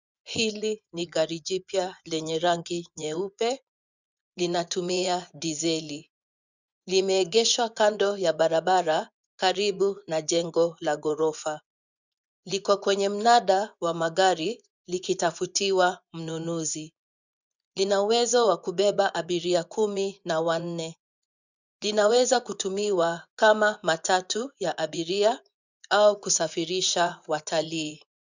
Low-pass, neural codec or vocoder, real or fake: 7.2 kHz; vocoder, 24 kHz, 100 mel bands, Vocos; fake